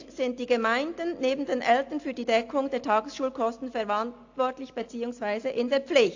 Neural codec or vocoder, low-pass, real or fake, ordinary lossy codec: none; 7.2 kHz; real; AAC, 48 kbps